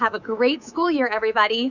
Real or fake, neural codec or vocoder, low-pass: fake; codec, 24 kHz, 3.1 kbps, DualCodec; 7.2 kHz